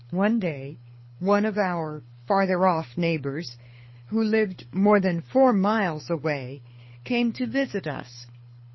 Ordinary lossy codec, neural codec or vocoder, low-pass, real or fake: MP3, 24 kbps; codec, 24 kHz, 6 kbps, HILCodec; 7.2 kHz; fake